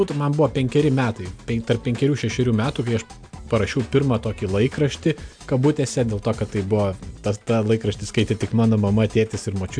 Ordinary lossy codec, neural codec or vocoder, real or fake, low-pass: AAC, 64 kbps; none; real; 9.9 kHz